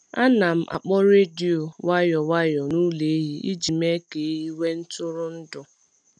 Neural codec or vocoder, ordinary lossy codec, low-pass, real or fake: none; none; 9.9 kHz; real